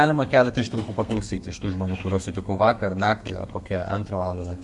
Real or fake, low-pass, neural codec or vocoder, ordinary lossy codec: fake; 10.8 kHz; codec, 32 kHz, 1.9 kbps, SNAC; Opus, 64 kbps